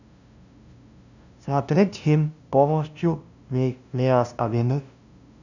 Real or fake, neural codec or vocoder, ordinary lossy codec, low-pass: fake; codec, 16 kHz, 0.5 kbps, FunCodec, trained on LibriTTS, 25 frames a second; none; 7.2 kHz